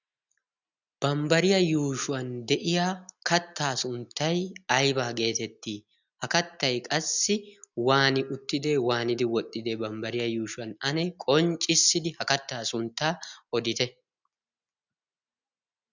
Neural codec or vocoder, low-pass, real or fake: none; 7.2 kHz; real